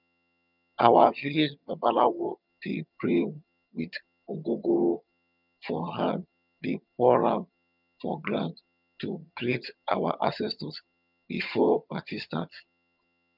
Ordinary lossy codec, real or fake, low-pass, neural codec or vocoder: none; fake; 5.4 kHz; vocoder, 22.05 kHz, 80 mel bands, HiFi-GAN